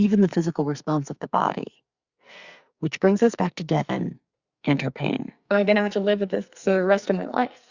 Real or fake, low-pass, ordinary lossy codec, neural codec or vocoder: fake; 7.2 kHz; Opus, 64 kbps; codec, 44.1 kHz, 2.6 kbps, SNAC